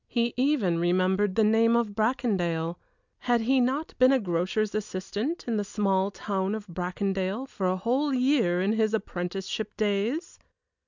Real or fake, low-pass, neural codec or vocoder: real; 7.2 kHz; none